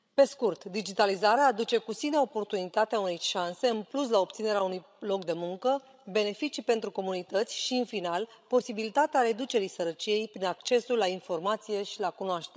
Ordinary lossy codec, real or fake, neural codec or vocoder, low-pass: none; fake; codec, 16 kHz, 16 kbps, FreqCodec, larger model; none